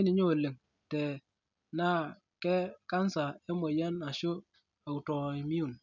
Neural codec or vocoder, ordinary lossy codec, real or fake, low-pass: none; none; real; 7.2 kHz